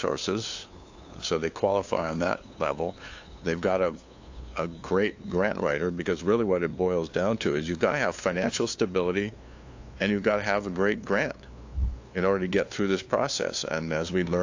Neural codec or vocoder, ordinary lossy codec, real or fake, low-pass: codec, 16 kHz, 2 kbps, FunCodec, trained on LibriTTS, 25 frames a second; AAC, 48 kbps; fake; 7.2 kHz